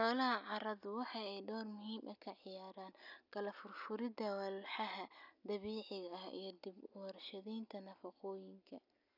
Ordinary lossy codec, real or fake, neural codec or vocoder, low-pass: none; real; none; 5.4 kHz